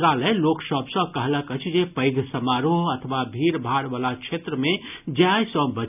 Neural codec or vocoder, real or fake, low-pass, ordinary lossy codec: none; real; 3.6 kHz; none